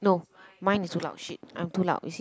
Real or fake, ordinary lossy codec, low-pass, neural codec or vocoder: real; none; none; none